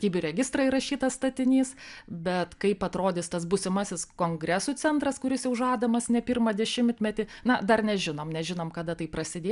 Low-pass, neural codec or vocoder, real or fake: 10.8 kHz; none; real